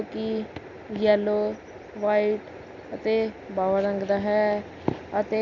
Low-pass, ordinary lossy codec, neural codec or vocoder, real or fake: 7.2 kHz; none; none; real